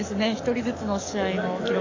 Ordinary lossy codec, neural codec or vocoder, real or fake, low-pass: AAC, 48 kbps; codec, 44.1 kHz, 7.8 kbps, DAC; fake; 7.2 kHz